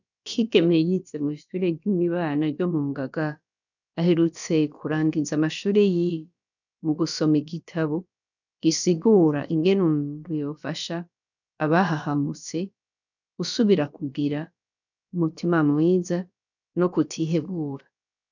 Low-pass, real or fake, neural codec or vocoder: 7.2 kHz; fake; codec, 16 kHz, about 1 kbps, DyCAST, with the encoder's durations